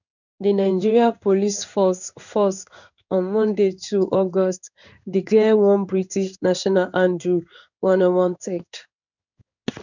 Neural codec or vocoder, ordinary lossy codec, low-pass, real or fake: codec, 16 kHz in and 24 kHz out, 1 kbps, XY-Tokenizer; none; 7.2 kHz; fake